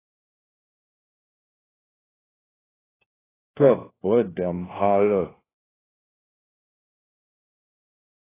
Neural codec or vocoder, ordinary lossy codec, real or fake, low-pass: codec, 16 kHz, 1 kbps, FunCodec, trained on LibriTTS, 50 frames a second; AAC, 16 kbps; fake; 3.6 kHz